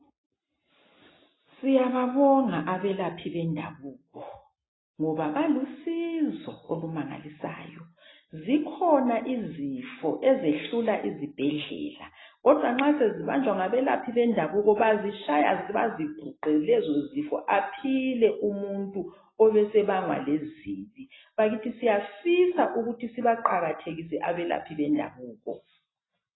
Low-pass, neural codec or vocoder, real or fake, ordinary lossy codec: 7.2 kHz; none; real; AAC, 16 kbps